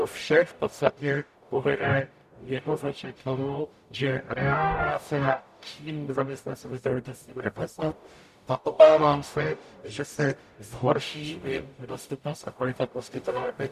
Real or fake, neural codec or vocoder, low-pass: fake; codec, 44.1 kHz, 0.9 kbps, DAC; 14.4 kHz